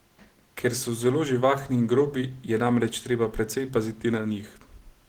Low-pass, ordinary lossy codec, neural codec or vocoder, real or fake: 19.8 kHz; Opus, 16 kbps; none; real